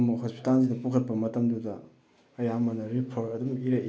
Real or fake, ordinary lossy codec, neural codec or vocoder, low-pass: real; none; none; none